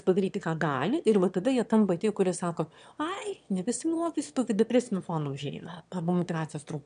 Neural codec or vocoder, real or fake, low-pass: autoencoder, 22.05 kHz, a latent of 192 numbers a frame, VITS, trained on one speaker; fake; 9.9 kHz